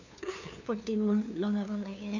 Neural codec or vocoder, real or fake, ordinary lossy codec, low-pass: codec, 16 kHz, 2 kbps, FunCodec, trained on LibriTTS, 25 frames a second; fake; none; 7.2 kHz